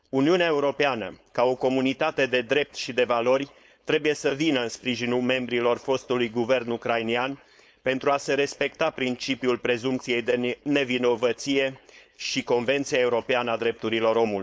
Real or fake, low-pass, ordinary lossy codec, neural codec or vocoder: fake; none; none; codec, 16 kHz, 4.8 kbps, FACodec